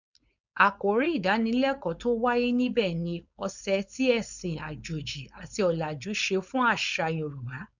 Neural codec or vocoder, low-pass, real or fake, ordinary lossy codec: codec, 16 kHz, 4.8 kbps, FACodec; 7.2 kHz; fake; none